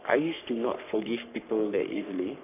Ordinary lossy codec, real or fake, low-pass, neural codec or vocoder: none; fake; 3.6 kHz; codec, 44.1 kHz, 3.4 kbps, Pupu-Codec